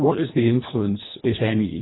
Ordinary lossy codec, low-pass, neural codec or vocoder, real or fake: AAC, 16 kbps; 7.2 kHz; codec, 24 kHz, 1.5 kbps, HILCodec; fake